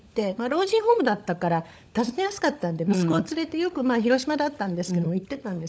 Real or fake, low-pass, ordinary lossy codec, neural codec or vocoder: fake; none; none; codec, 16 kHz, 16 kbps, FunCodec, trained on LibriTTS, 50 frames a second